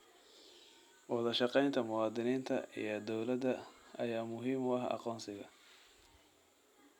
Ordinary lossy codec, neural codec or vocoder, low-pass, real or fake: none; none; 19.8 kHz; real